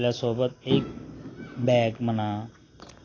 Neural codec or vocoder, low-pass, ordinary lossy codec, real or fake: none; 7.2 kHz; AAC, 32 kbps; real